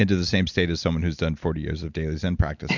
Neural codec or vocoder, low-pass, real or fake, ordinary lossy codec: none; 7.2 kHz; real; Opus, 64 kbps